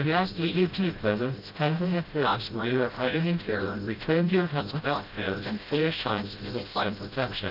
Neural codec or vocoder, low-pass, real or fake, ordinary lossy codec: codec, 16 kHz, 0.5 kbps, FreqCodec, smaller model; 5.4 kHz; fake; Opus, 24 kbps